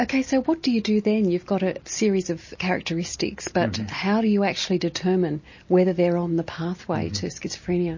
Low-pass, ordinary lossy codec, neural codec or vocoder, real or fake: 7.2 kHz; MP3, 32 kbps; none; real